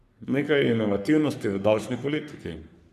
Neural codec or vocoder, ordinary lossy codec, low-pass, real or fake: codec, 44.1 kHz, 3.4 kbps, Pupu-Codec; none; 14.4 kHz; fake